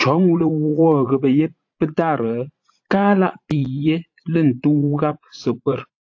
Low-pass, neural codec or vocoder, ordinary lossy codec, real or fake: 7.2 kHz; vocoder, 44.1 kHz, 80 mel bands, Vocos; AAC, 48 kbps; fake